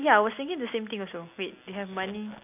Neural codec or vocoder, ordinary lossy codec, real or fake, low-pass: none; none; real; 3.6 kHz